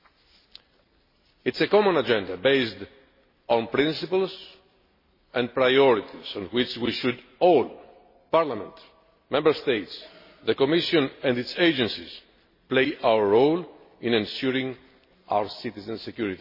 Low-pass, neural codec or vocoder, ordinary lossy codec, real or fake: 5.4 kHz; none; MP3, 24 kbps; real